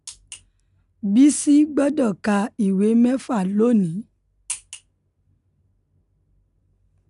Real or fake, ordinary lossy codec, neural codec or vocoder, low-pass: real; none; none; 10.8 kHz